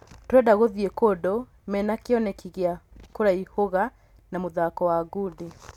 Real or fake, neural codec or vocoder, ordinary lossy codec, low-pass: real; none; none; 19.8 kHz